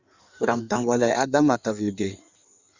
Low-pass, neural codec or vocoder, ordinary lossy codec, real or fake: 7.2 kHz; codec, 16 kHz in and 24 kHz out, 1.1 kbps, FireRedTTS-2 codec; Opus, 64 kbps; fake